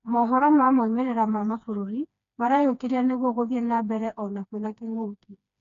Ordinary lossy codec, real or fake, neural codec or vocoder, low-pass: none; fake; codec, 16 kHz, 2 kbps, FreqCodec, smaller model; 7.2 kHz